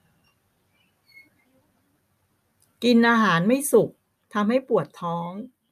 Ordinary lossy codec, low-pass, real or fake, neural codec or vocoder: none; 14.4 kHz; real; none